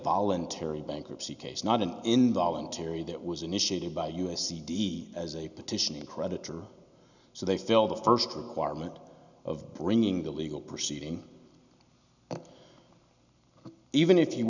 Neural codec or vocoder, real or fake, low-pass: none; real; 7.2 kHz